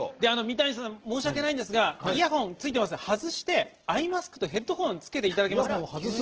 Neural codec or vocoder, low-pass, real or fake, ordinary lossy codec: none; 7.2 kHz; real; Opus, 16 kbps